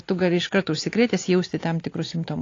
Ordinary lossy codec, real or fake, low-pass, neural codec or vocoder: AAC, 32 kbps; real; 7.2 kHz; none